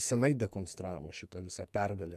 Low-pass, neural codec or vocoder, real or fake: 14.4 kHz; codec, 44.1 kHz, 2.6 kbps, SNAC; fake